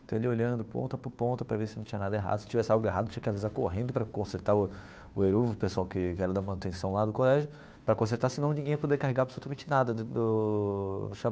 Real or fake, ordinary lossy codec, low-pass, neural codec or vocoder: fake; none; none; codec, 16 kHz, 2 kbps, FunCodec, trained on Chinese and English, 25 frames a second